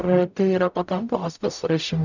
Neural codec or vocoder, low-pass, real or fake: codec, 44.1 kHz, 0.9 kbps, DAC; 7.2 kHz; fake